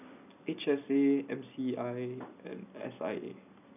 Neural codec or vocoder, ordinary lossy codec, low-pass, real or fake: none; none; 3.6 kHz; real